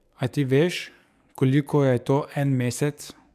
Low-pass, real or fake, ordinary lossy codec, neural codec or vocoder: 14.4 kHz; fake; MP3, 96 kbps; codec, 44.1 kHz, 7.8 kbps, DAC